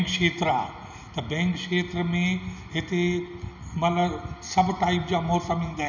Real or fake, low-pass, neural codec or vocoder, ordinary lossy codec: real; 7.2 kHz; none; none